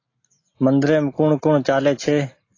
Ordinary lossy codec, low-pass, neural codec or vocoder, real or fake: AAC, 32 kbps; 7.2 kHz; none; real